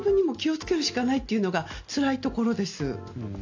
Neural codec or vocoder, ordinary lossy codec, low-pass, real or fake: none; AAC, 48 kbps; 7.2 kHz; real